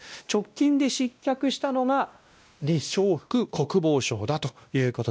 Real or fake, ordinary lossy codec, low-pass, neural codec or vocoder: fake; none; none; codec, 16 kHz, 1 kbps, X-Codec, WavLM features, trained on Multilingual LibriSpeech